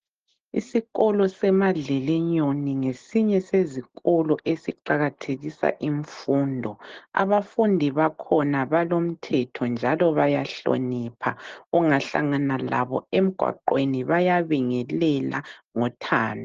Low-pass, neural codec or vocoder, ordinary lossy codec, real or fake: 7.2 kHz; none; Opus, 16 kbps; real